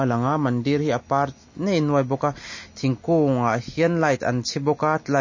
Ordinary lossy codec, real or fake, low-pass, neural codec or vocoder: MP3, 32 kbps; real; 7.2 kHz; none